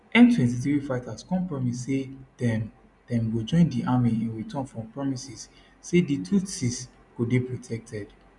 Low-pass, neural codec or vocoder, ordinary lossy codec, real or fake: 10.8 kHz; none; none; real